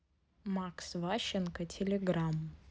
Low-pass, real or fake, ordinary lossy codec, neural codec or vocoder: none; real; none; none